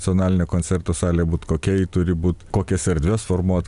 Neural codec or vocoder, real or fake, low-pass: none; real; 10.8 kHz